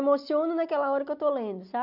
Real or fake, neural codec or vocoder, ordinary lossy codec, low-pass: real; none; none; 5.4 kHz